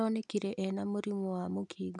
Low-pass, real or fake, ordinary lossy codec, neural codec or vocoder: 10.8 kHz; real; none; none